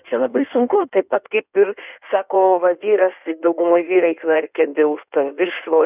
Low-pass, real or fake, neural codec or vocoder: 3.6 kHz; fake; codec, 16 kHz in and 24 kHz out, 1.1 kbps, FireRedTTS-2 codec